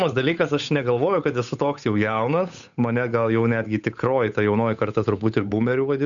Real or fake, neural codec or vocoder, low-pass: fake; codec, 16 kHz, 8 kbps, FunCodec, trained on Chinese and English, 25 frames a second; 7.2 kHz